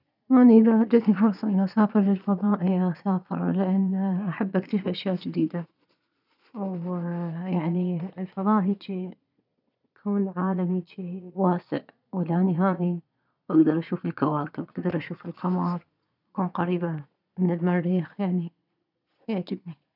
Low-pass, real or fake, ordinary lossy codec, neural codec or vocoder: 5.4 kHz; fake; none; vocoder, 22.05 kHz, 80 mel bands, Vocos